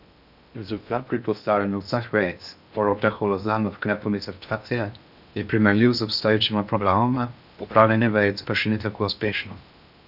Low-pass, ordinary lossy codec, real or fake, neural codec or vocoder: 5.4 kHz; none; fake; codec, 16 kHz in and 24 kHz out, 0.6 kbps, FocalCodec, streaming, 2048 codes